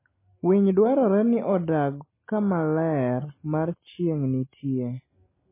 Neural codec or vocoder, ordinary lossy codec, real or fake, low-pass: none; MP3, 16 kbps; real; 3.6 kHz